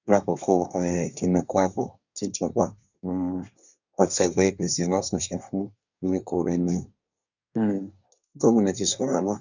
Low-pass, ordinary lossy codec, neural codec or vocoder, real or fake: 7.2 kHz; none; codec, 24 kHz, 1 kbps, SNAC; fake